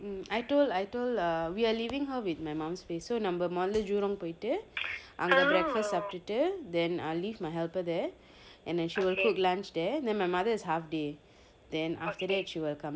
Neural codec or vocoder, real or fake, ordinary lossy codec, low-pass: none; real; none; none